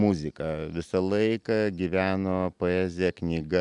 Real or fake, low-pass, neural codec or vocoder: real; 10.8 kHz; none